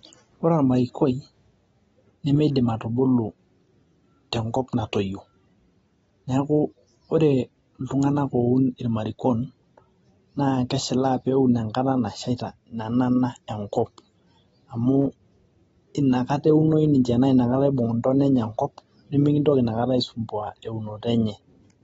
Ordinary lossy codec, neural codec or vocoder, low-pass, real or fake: AAC, 24 kbps; none; 19.8 kHz; real